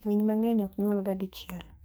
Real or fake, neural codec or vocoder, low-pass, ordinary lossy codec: fake; codec, 44.1 kHz, 2.6 kbps, SNAC; none; none